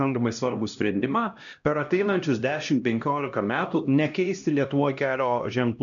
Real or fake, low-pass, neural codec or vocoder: fake; 7.2 kHz; codec, 16 kHz, 1 kbps, X-Codec, HuBERT features, trained on LibriSpeech